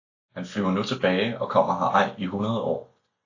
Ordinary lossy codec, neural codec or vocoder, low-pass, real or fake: AAC, 48 kbps; none; 7.2 kHz; real